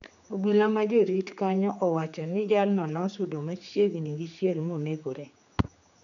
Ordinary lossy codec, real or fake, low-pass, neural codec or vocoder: MP3, 96 kbps; fake; 7.2 kHz; codec, 16 kHz, 4 kbps, X-Codec, HuBERT features, trained on general audio